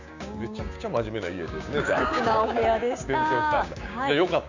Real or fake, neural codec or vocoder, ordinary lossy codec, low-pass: fake; codec, 16 kHz, 6 kbps, DAC; none; 7.2 kHz